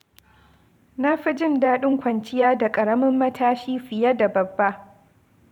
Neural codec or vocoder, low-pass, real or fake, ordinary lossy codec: vocoder, 44.1 kHz, 128 mel bands every 512 samples, BigVGAN v2; 19.8 kHz; fake; none